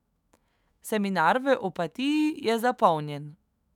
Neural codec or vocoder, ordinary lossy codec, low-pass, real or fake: autoencoder, 48 kHz, 128 numbers a frame, DAC-VAE, trained on Japanese speech; none; 19.8 kHz; fake